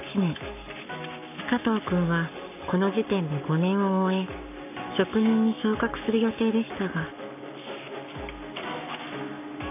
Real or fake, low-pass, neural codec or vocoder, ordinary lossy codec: fake; 3.6 kHz; codec, 44.1 kHz, 7.8 kbps, Pupu-Codec; none